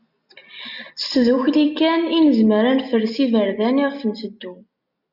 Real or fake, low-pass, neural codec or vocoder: real; 5.4 kHz; none